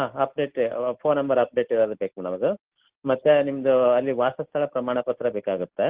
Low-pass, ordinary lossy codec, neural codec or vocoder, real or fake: 3.6 kHz; Opus, 16 kbps; none; real